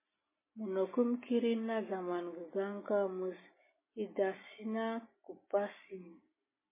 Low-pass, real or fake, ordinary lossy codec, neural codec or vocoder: 3.6 kHz; real; MP3, 16 kbps; none